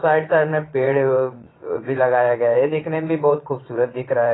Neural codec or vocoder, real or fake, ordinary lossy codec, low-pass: vocoder, 22.05 kHz, 80 mel bands, WaveNeXt; fake; AAC, 16 kbps; 7.2 kHz